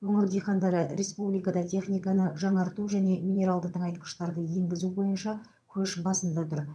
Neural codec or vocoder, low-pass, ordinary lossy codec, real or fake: vocoder, 22.05 kHz, 80 mel bands, HiFi-GAN; none; none; fake